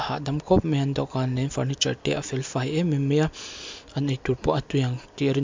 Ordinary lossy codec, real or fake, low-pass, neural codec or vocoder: MP3, 64 kbps; real; 7.2 kHz; none